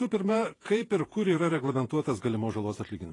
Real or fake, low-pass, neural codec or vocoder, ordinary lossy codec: fake; 10.8 kHz; vocoder, 48 kHz, 128 mel bands, Vocos; AAC, 32 kbps